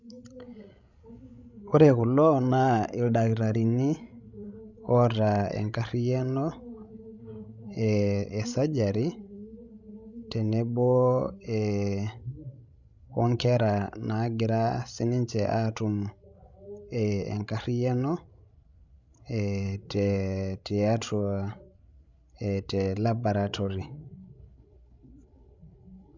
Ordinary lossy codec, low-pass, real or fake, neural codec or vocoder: none; 7.2 kHz; fake; codec, 16 kHz, 16 kbps, FreqCodec, larger model